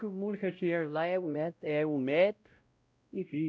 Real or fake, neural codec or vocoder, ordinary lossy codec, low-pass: fake; codec, 16 kHz, 0.5 kbps, X-Codec, WavLM features, trained on Multilingual LibriSpeech; none; none